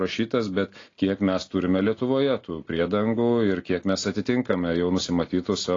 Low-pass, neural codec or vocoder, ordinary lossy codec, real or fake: 7.2 kHz; none; AAC, 32 kbps; real